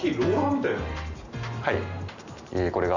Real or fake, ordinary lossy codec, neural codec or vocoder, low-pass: real; none; none; 7.2 kHz